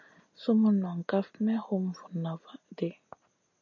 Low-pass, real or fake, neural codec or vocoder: 7.2 kHz; real; none